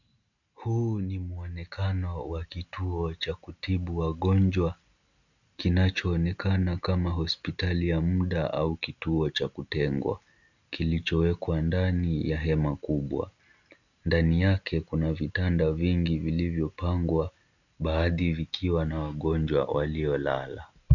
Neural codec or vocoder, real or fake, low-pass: none; real; 7.2 kHz